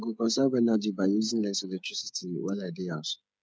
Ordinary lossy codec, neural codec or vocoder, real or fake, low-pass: none; codec, 16 kHz, 8 kbps, FreqCodec, smaller model; fake; none